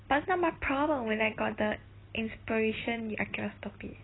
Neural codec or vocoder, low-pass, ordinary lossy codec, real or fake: none; 7.2 kHz; AAC, 16 kbps; real